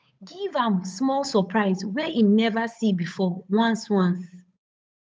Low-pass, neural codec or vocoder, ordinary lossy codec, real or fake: none; codec, 16 kHz, 8 kbps, FunCodec, trained on Chinese and English, 25 frames a second; none; fake